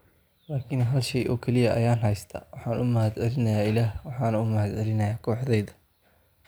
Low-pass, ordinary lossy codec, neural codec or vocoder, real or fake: none; none; none; real